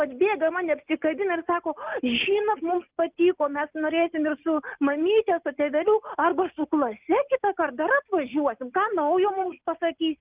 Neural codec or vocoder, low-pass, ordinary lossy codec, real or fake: none; 3.6 kHz; Opus, 24 kbps; real